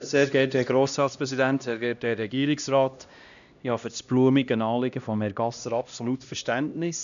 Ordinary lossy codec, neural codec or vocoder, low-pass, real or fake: none; codec, 16 kHz, 1 kbps, X-Codec, HuBERT features, trained on LibriSpeech; 7.2 kHz; fake